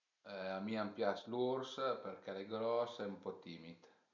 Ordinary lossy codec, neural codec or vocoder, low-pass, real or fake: none; none; 7.2 kHz; real